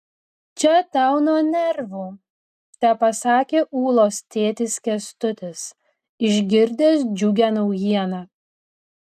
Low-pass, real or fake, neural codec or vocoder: 14.4 kHz; real; none